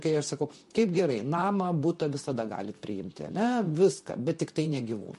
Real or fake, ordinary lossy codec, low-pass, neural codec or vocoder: fake; MP3, 48 kbps; 14.4 kHz; vocoder, 44.1 kHz, 128 mel bands, Pupu-Vocoder